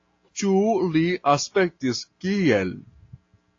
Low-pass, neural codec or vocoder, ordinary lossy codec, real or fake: 7.2 kHz; none; AAC, 32 kbps; real